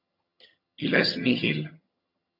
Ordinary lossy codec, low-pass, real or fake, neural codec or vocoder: MP3, 48 kbps; 5.4 kHz; fake; vocoder, 22.05 kHz, 80 mel bands, HiFi-GAN